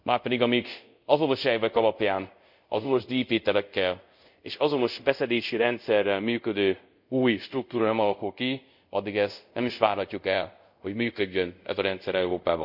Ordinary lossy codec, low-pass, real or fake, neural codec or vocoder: none; 5.4 kHz; fake; codec, 24 kHz, 0.5 kbps, DualCodec